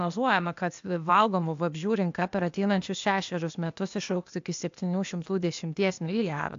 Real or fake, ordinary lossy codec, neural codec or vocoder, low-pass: fake; AAC, 64 kbps; codec, 16 kHz, 0.8 kbps, ZipCodec; 7.2 kHz